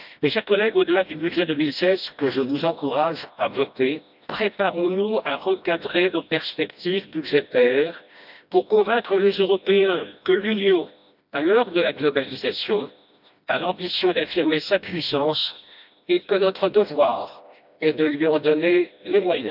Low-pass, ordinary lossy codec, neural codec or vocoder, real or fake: 5.4 kHz; none; codec, 16 kHz, 1 kbps, FreqCodec, smaller model; fake